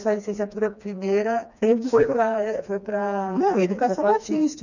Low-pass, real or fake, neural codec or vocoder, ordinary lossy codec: 7.2 kHz; fake; codec, 16 kHz, 2 kbps, FreqCodec, smaller model; none